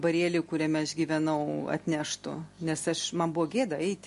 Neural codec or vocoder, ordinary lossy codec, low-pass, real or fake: none; MP3, 48 kbps; 14.4 kHz; real